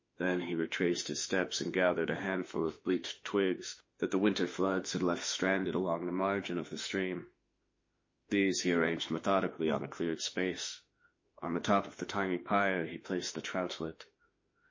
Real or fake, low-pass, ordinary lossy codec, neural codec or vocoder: fake; 7.2 kHz; MP3, 32 kbps; autoencoder, 48 kHz, 32 numbers a frame, DAC-VAE, trained on Japanese speech